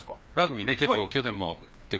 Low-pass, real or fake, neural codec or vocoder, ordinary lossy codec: none; fake; codec, 16 kHz, 2 kbps, FreqCodec, larger model; none